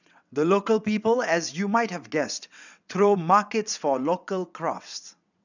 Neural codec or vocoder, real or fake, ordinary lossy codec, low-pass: vocoder, 22.05 kHz, 80 mel bands, WaveNeXt; fake; none; 7.2 kHz